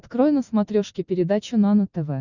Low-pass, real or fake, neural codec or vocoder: 7.2 kHz; real; none